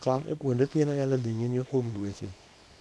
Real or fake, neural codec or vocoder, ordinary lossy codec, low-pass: fake; codec, 24 kHz, 0.9 kbps, WavTokenizer, small release; none; none